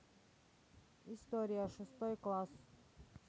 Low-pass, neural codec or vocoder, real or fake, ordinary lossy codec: none; none; real; none